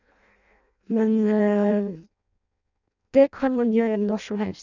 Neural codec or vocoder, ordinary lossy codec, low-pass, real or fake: codec, 16 kHz in and 24 kHz out, 0.6 kbps, FireRedTTS-2 codec; none; 7.2 kHz; fake